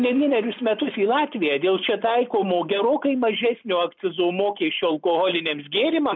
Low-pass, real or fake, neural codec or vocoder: 7.2 kHz; real; none